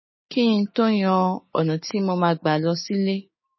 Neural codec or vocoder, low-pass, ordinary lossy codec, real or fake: codec, 16 kHz, 6 kbps, DAC; 7.2 kHz; MP3, 24 kbps; fake